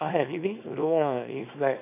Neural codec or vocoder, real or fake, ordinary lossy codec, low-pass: codec, 24 kHz, 0.9 kbps, WavTokenizer, small release; fake; none; 3.6 kHz